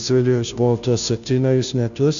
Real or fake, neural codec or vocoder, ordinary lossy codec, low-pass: fake; codec, 16 kHz, 0.5 kbps, FunCodec, trained on Chinese and English, 25 frames a second; MP3, 96 kbps; 7.2 kHz